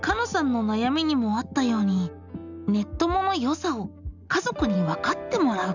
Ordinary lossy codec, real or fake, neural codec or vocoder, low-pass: none; real; none; 7.2 kHz